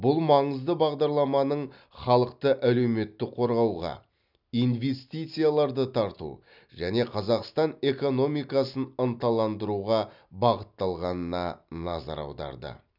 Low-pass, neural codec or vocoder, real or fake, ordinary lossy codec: 5.4 kHz; none; real; none